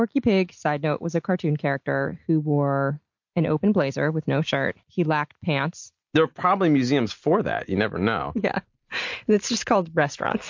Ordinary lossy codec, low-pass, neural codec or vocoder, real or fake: MP3, 48 kbps; 7.2 kHz; none; real